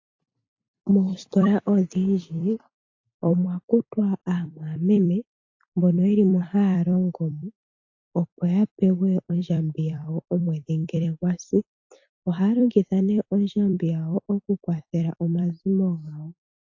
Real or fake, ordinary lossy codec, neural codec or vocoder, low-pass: fake; AAC, 48 kbps; vocoder, 44.1 kHz, 128 mel bands every 256 samples, BigVGAN v2; 7.2 kHz